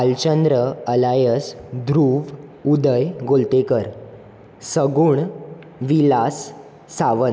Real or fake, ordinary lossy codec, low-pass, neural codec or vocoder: real; none; none; none